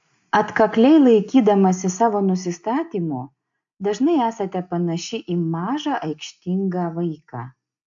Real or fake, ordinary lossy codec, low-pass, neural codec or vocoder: real; AAC, 64 kbps; 7.2 kHz; none